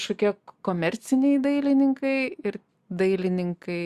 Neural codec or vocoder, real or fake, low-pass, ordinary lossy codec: none; real; 14.4 kHz; Opus, 64 kbps